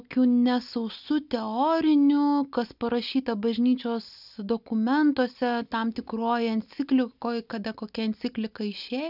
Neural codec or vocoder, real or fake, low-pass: none; real; 5.4 kHz